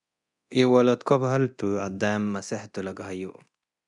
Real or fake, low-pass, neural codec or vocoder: fake; 10.8 kHz; codec, 24 kHz, 0.9 kbps, DualCodec